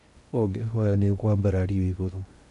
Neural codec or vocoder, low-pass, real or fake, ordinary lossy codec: codec, 16 kHz in and 24 kHz out, 0.6 kbps, FocalCodec, streaming, 2048 codes; 10.8 kHz; fake; none